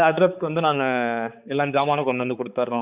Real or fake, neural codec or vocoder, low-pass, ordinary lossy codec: fake; codec, 16 kHz, 4 kbps, X-Codec, HuBERT features, trained on balanced general audio; 3.6 kHz; Opus, 64 kbps